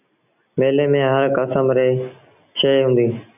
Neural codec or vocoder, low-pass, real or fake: none; 3.6 kHz; real